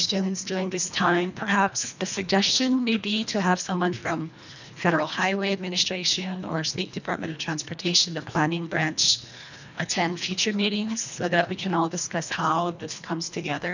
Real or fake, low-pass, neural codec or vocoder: fake; 7.2 kHz; codec, 24 kHz, 1.5 kbps, HILCodec